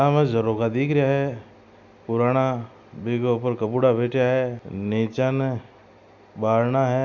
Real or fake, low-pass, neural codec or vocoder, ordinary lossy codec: real; 7.2 kHz; none; none